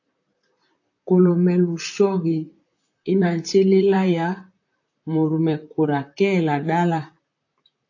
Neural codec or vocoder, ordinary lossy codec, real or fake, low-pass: vocoder, 44.1 kHz, 128 mel bands, Pupu-Vocoder; AAC, 48 kbps; fake; 7.2 kHz